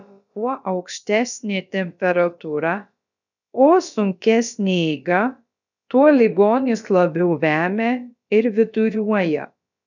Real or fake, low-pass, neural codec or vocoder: fake; 7.2 kHz; codec, 16 kHz, about 1 kbps, DyCAST, with the encoder's durations